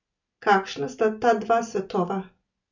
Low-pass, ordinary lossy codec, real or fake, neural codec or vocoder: 7.2 kHz; none; real; none